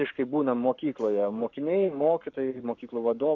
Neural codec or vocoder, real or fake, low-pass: codec, 44.1 kHz, 7.8 kbps, Pupu-Codec; fake; 7.2 kHz